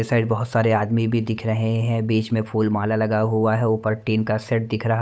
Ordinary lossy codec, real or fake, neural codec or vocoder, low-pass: none; fake; codec, 16 kHz, 8 kbps, FunCodec, trained on Chinese and English, 25 frames a second; none